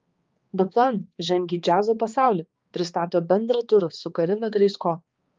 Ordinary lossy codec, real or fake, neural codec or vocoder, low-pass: Opus, 32 kbps; fake; codec, 16 kHz, 2 kbps, X-Codec, HuBERT features, trained on balanced general audio; 7.2 kHz